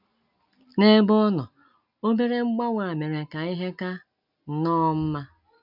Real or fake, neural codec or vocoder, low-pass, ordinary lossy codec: real; none; 5.4 kHz; none